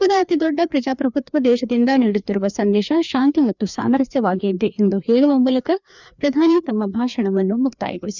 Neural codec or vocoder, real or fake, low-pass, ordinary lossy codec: codec, 16 kHz, 2 kbps, FreqCodec, larger model; fake; 7.2 kHz; none